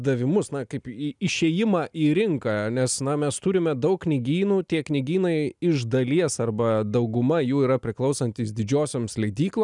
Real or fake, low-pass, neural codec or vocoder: real; 10.8 kHz; none